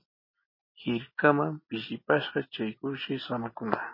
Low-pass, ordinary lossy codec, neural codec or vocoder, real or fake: 5.4 kHz; MP3, 24 kbps; none; real